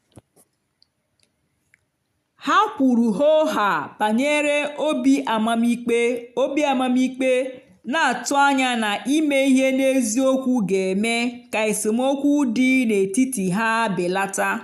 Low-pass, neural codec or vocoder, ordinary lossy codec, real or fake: 14.4 kHz; none; none; real